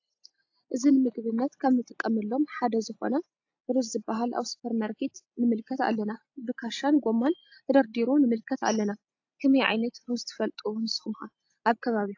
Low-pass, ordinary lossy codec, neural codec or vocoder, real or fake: 7.2 kHz; AAC, 48 kbps; none; real